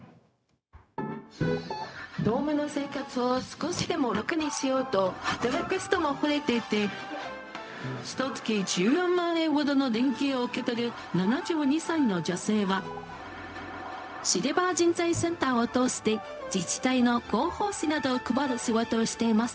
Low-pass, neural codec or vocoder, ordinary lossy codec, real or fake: none; codec, 16 kHz, 0.4 kbps, LongCat-Audio-Codec; none; fake